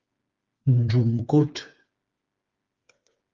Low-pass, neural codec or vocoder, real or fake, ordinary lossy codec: 7.2 kHz; codec, 16 kHz, 4 kbps, FreqCodec, smaller model; fake; Opus, 32 kbps